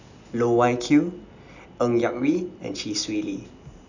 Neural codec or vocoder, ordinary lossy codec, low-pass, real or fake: none; none; 7.2 kHz; real